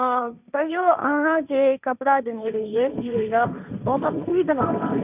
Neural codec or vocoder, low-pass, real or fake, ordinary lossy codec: codec, 16 kHz, 1.1 kbps, Voila-Tokenizer; 3.6 kHz; fake; none